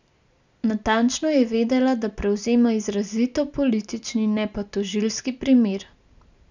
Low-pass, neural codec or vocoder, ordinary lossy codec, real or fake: 7.2 kHz; none; none; real